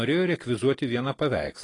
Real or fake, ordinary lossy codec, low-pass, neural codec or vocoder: real; AAC, 32 kbps; 10.8 kHz; none